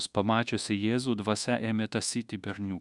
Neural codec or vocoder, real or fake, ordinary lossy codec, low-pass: codec, 24 kHz, 1.2 kbps, DualCodec; fake; Opus, 64 kbps; 10.8 kHz